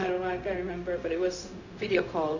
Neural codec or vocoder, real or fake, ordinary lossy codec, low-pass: codec, 16 kHz, 0.4 kbps, LongCat-Audio-Codec; fake; none; 7.2 kHz